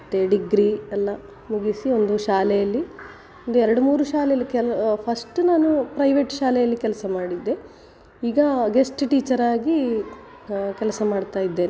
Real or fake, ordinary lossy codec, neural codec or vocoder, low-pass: real; none; none; none